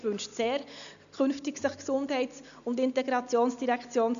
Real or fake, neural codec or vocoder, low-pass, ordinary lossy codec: real; none; 7.2 kHz; none